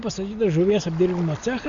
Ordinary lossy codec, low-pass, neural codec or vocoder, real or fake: Opus, 64 kbps; 7.2 kHz; none; real